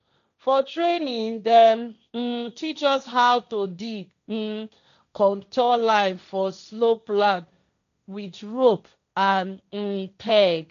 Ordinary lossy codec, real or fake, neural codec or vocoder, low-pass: none; fake; codec, 16 kHz, 1.1 kbps, Voila-Tokenizer; 7.2 kHz